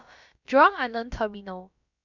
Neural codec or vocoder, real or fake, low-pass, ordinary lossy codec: codec, 16 kHz, about 1 kbps, DyCAST, with the encoder's durations; fake; 7.2 kHz; none